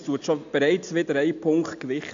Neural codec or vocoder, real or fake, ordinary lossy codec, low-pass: none; real; AAC, 64 kbps; 7.2 kHz